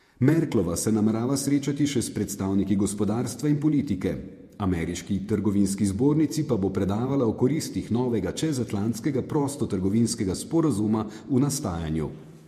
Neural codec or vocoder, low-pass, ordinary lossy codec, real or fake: vocoder, 48 kHz, 128 mel bands, Vocos; 14.4 kHz; MP3, 64 kbps; fake